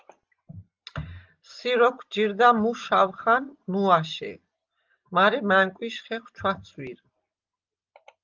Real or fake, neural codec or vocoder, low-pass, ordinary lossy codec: real; none; 7.2 kHz; Opus, 24 kbps